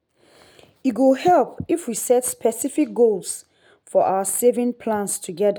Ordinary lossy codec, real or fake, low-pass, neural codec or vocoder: none; real; none; none